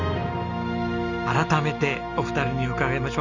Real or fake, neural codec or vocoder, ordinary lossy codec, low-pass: real; none; none; 7.2 kHz